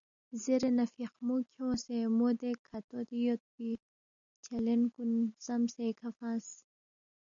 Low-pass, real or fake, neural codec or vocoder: 7.2 kHz; real; none